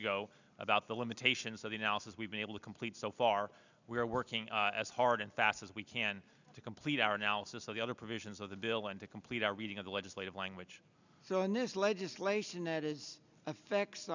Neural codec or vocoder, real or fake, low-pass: none; real; 7.2 kHz